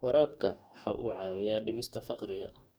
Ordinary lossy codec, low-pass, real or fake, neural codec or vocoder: none; none; fake; codec, 44.1 kHz, 2.6 kbps, DAC